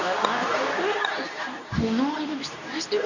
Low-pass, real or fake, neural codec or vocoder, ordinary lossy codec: 7.2 kHz; fake; codec, 24 kHz, 0.9 kbps, WavTokenizer, medium speech release version 2; none